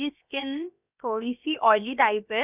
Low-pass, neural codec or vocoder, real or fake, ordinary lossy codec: 3.6 kHz; codec, 16 kHz, about 1 kbps, DyCAST, with the encoder's durations; fake; none